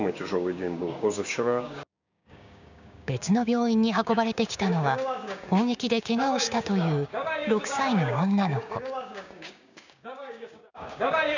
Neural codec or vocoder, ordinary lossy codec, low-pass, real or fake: codec, 16 kHz, 6 kbps, DAC; none; 7.2 kHz; fake